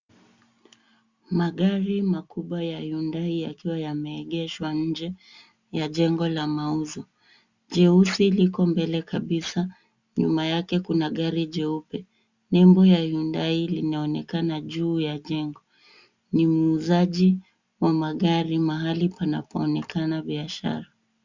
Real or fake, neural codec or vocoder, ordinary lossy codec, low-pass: real; none; Opus, 64 kbps; 7.2 kHz